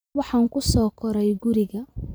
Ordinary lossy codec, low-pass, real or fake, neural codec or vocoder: none; none; fake; vocoder, 44.1 kHz, 128 mel bands every 512 samples, BigVGAN v2